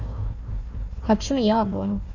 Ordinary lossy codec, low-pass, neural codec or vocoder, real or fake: none; 7.2 kHz; codec, 16 kHz, 1 kbps, FunCodec, trained on Chinese and English, 50 frames a second; fake